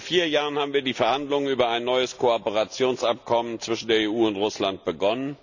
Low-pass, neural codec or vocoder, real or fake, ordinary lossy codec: 7.2 kHz; none; real; none